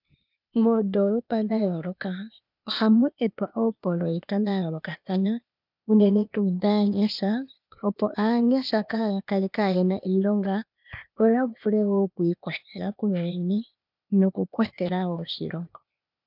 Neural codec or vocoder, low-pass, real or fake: codec, 16 kHz, 0.8 kbps, ZipCodec; 5.4 kHz; fake